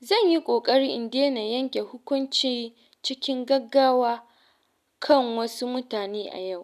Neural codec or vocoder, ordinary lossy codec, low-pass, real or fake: none; none; 14.4 kHz; real